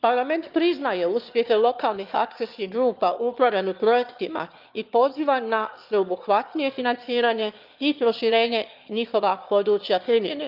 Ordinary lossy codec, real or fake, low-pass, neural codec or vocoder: Opus, 24 kbps; fake; 5.4 kHz; autoencoder, 22.05 kHz, a latent of 192 numbers a frame, VITS, trained on one speaker